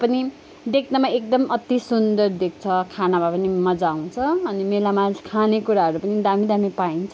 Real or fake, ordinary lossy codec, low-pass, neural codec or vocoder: real; none; none; none